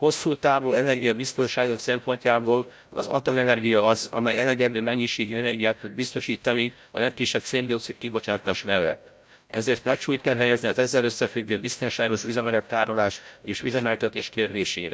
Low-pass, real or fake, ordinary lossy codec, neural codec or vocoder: none; fake; none; codec, 16 kHz, 0.5 kbps, FreqCodec, larger model